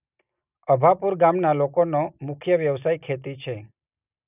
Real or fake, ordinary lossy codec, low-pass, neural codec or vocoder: real; none; 3.6 kHz; none